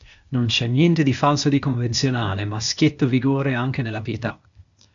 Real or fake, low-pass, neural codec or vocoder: fake; 7.2 kHz; codec, 16 kHz, 0.8 kbps, ZipCodec